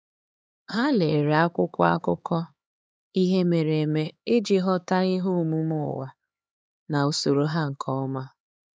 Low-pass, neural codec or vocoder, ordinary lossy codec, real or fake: none; codec, 16 kHz, 4 kbps, X-Codec, HuBERT features, trained on LibriSpeech; none; fake